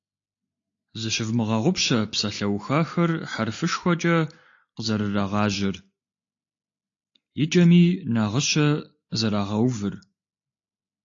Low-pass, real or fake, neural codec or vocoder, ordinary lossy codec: 7.2 kHz; real; none; AAC, 48 kbps